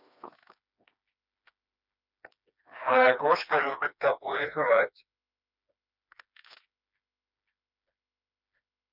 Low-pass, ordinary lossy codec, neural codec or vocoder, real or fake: 5.4 kHz; none; codec, 16 kHz, 2 kbps, FreqCodec, smaller model; fake